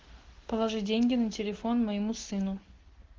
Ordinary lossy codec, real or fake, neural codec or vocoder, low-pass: Opus, 16 kbps; real; none; 7.2 kHz